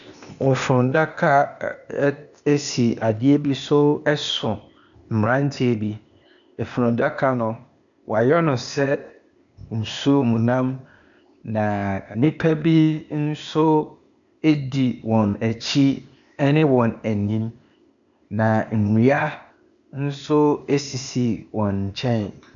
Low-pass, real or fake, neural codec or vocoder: 7.2 kHz; fake; codec, 16 kHz, 0.8 kbps, ZipCodec